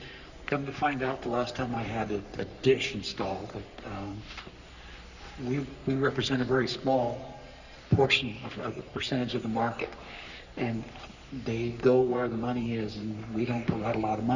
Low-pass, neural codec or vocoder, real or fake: 7.2 kHz; codec, 44.1 kHz, 3.4 kbps, Pupu-Codec; fake